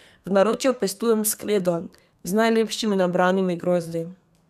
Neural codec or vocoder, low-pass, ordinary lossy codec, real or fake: codec, 32 kHz, 1.9 kbps, SNAC; 14.4 kHz; none; fake